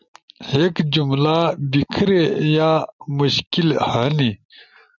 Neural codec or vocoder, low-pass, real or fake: none; 7.2 kHz; real